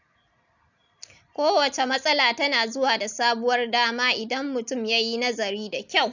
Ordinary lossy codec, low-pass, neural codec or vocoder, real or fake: none; 7.2 kHz; none; real